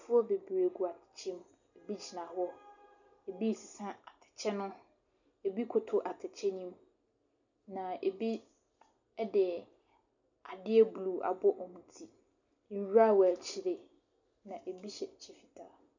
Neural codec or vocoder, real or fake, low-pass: none; real; 7.2 kHz